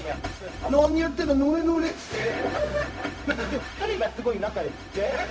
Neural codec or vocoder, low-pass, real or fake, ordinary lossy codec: codec, 16 kHz, 0.4 kbps, LongCat-Audio-Codec; none; fake; none